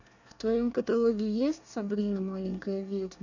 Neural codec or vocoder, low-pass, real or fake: codec, 24 kHz, 1 kbps, SNAC; 7.2 kHz; fake